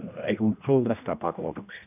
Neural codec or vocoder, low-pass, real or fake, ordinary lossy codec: codec, 16 kHz, 1 kbps, X-Codec, HuBERT features, trained on general audio; 3.6 kHz; fake; none